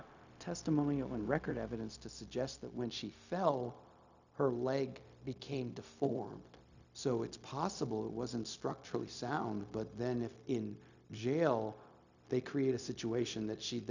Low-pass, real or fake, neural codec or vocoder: 7.2 kHz; fake; codec, 16 kHz, 0.4 kbps, LongCat-Audio-Codec